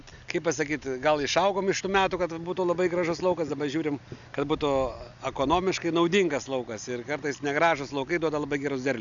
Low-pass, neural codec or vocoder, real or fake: 7.2 kHz; none; real